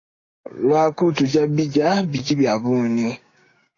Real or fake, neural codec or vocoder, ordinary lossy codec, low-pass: fake; codec, 16 kHz, 6 kbps, DAC; AAC, 32 kbps; 7.2 kHz